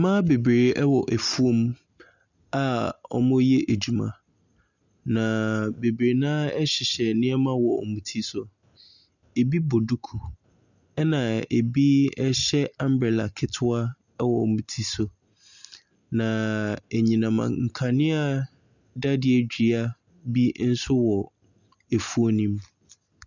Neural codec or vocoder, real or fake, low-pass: none; real; 7.2 kHz